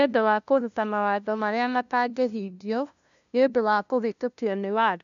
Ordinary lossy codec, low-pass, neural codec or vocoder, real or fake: none; 7.2 kHz; codec, 16 kHz, 0.5 kbps, FunCodec, trained on LibriTTS, 25 frames a second; fake